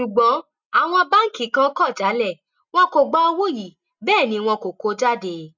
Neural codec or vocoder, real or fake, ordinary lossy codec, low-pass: none; real; none; 7.2 kHz